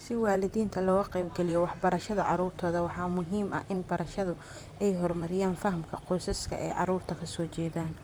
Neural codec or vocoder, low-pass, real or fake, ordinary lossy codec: vocoder, 44.1 kHz, 128 mel bands, Pupu-Vocoder; none; fake; none